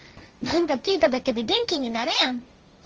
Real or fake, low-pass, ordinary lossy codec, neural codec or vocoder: fake; 7.2 kHz; Opus, 24 kbps; codec, 16 kHz, 1.1 kbps, Voila-Tokenizer